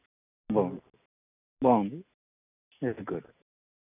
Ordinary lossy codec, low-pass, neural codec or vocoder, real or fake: none; 3.6 kHz; none; real